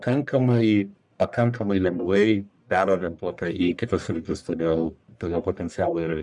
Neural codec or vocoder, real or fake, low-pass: codec, 44.1 kHz, 1.7 kbps, Pupu-Codec; fake; 10.8 kHz